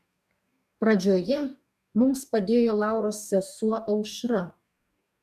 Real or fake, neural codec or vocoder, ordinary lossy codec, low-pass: fake; codec, 44.1 kHz, 2.6 kbps, DAC; MP3, 96 kbps; 14.4 kHz